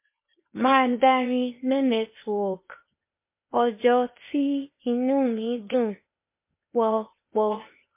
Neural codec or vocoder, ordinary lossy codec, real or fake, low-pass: codec, 16 kHz, 0.8 kbps, ZipCodec; MP3, 24 kbps; fake; 3.6 kHz